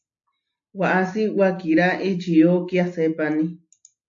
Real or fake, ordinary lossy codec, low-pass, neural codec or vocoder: real; AAC, 48 kbps; 7.2 kHz; none